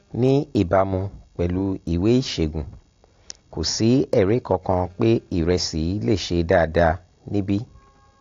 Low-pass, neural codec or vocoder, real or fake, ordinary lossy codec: 7.2 kHz; none; real; AAC, 48 kbps